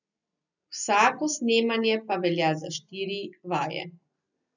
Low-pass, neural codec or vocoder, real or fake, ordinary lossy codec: 7.2 kHz; none; real; none